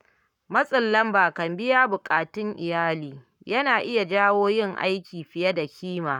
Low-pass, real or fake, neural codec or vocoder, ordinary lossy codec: 19.8 kHz; fake; codec, 44.1 kHz, 7.8 kbps, Pupu-Codec; none